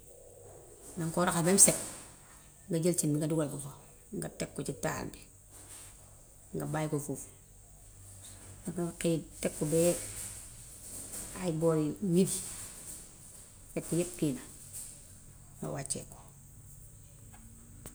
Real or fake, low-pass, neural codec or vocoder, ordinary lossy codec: real; none; none; none